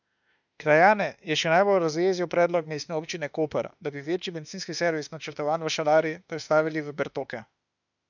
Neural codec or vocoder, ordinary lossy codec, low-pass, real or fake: autoencoder, 48 kHz, 32 numbers a frame, DAC-VAE, trained on Japanese speech; none; 7.2 kHz; fake